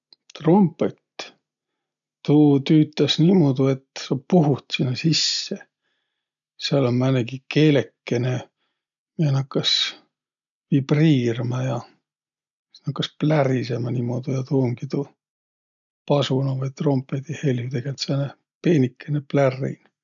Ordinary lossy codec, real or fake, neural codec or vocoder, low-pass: none; real; none; 7.2 kHz